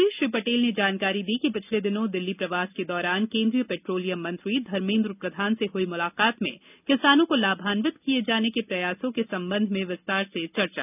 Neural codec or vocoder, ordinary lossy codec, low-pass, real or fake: none; none; 3.6 kHz; real